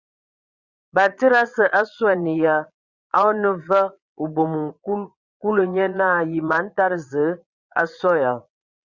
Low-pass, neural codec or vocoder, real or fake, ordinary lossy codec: 7.2 kHz; vocoder, 22.05 kHz, 80 mel bands, Vocos; fake; Opus, 64 kbps